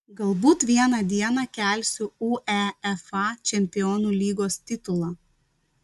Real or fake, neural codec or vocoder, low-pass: real; none; 14.4 kHz